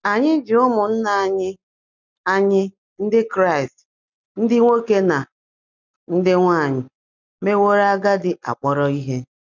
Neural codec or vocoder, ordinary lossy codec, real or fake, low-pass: none; none; real; 7.2 kHz